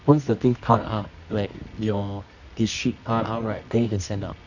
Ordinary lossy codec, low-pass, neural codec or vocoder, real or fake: none; 7.2 kHz; codec, 24 kHz, 0.9 kbps, WavTokenizer, medium music audio release; fake